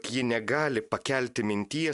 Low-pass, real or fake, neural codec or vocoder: 10.8 kHz; real; none